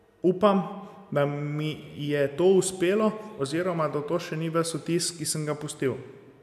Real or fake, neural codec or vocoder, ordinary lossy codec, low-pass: real; none; none; 14.4 kHz